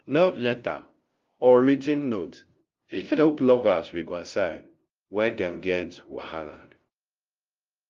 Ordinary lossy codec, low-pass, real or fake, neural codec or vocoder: Opus, 32 kbps; 7.2 kHz; fake; codec, 16 kHz, 0.5 kbps, FunCodec, trained on LibriTTS, 25 frames a second